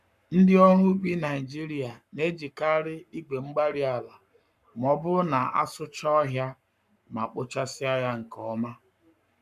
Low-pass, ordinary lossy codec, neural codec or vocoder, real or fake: 14.4 kHz; none; codec, 44.1 kHz, 7.8 kbps, Pupu-Codec; fake